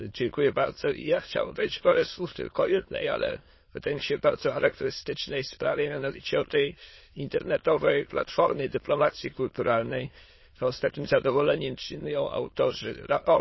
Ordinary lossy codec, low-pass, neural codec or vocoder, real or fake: MP3, 24 kbps; 7.2 kHz; autoencoder, 22.05 kHz, a latent of 192 numbers a frame, VITS, trained on many speakers; fake